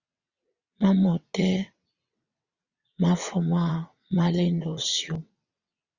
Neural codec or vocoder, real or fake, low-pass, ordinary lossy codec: vocoder, 22.05 kHz, 80 mel bands, WaveNeXt; fake; 7.2 kHz; Opus, 64 kbps